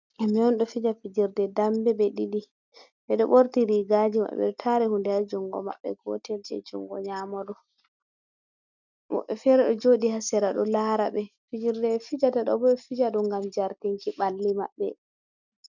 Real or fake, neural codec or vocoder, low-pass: real; none; 7.2 kHz